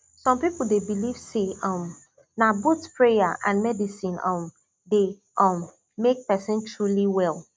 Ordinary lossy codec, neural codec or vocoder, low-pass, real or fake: none; none; none; real